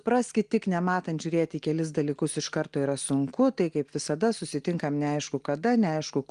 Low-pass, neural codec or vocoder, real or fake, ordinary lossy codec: 9.9 kHz; none; real; Opus, 24 kbps